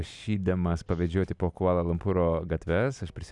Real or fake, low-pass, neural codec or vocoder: real; 10.8 kHz; none